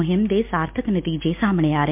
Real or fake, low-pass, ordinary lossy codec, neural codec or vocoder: real; 3.6 kHz; MP3, 32 kbps; none